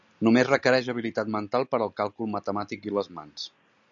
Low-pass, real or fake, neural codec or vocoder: 7.2 kHz; real; none